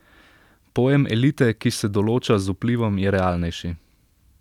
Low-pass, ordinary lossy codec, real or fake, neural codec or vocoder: 19.8 kHz; none; real; none